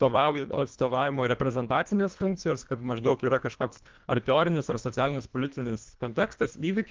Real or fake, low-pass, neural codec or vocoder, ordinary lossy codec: fake; 7.2 kHz; codec, 24 kHz, 1.5 kbps, HILCodec; Opus, 32 kbps